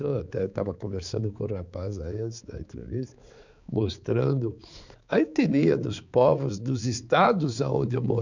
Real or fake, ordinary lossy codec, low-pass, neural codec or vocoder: fake; none; 7.2 kHz; codec, 16 kHz, 4 kbps, X-Codec, HuBERT features, trained on balanced general audio